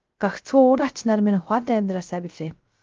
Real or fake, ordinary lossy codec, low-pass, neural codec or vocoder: fake; Opus, 24 kbps; 7.2 kHz; codec, 16 kHz, 0.3 kbps, FocalCodec